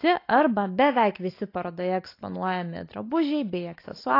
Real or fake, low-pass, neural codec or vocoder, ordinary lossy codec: real; 5.4 kHz; none; AAC, 32 kbps